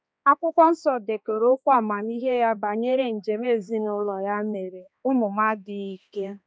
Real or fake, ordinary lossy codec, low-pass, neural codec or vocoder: fake; none; none; codec, 16 kHz, 2 kbps, X-Codec, HuBERT features, trained on balanced general audio